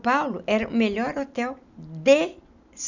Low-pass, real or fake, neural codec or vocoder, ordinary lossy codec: 7.2 kHz; real; none; none